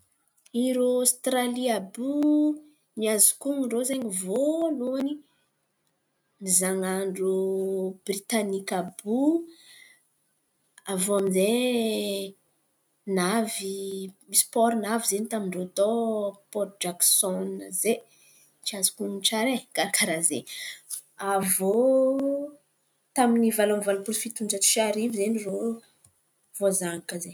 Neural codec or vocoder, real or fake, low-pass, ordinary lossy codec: none; real; none; none